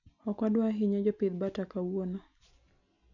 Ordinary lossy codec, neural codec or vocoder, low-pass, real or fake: none; none; 7.2 kHz; real